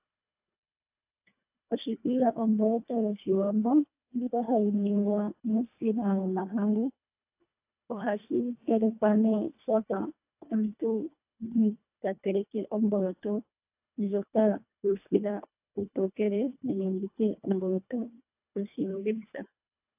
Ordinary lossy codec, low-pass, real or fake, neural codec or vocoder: AAC, 32 kbps; 3.6 kHz; fake; codec, 24 kHz, 1.5 kbps, HILCodec